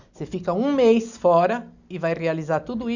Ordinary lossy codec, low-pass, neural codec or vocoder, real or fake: none; 7.2 kHz; none; real